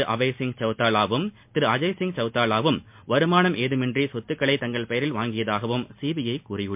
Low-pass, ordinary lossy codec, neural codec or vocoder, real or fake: 3.6 kHz; MP3, 32 kbps; none; real